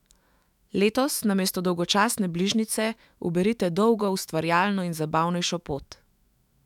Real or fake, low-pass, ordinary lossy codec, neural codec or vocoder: fake; 19.8 kHz; none; autoencoder, 48 kHz, 128 numbers a frame, DAC-VAE, trained on Japanese speech